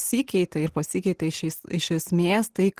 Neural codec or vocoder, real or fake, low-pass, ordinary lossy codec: none; real; 14.4 kHz; Opus, 16 kbps